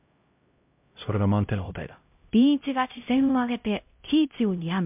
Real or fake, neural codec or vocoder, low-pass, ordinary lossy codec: fake; codec, 16 kHz, 0.5 kbps, X-Codec, HuBERT features, trained on LibriSpeech; 3.6 kHz; MP3, 32 kbps